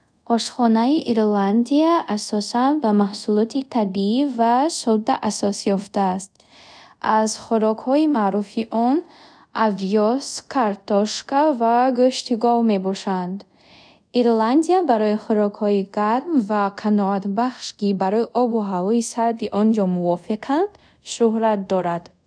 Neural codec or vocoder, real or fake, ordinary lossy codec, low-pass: codec, 24 kHz, 0.5 kbps, DualCodec; fake; none; 9.9 kHz